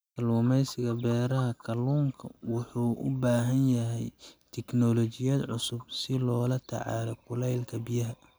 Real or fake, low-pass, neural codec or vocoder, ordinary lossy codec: real; none; none; none